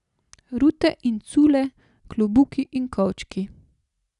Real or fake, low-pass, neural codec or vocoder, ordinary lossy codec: real; 10.8 kHz; none; none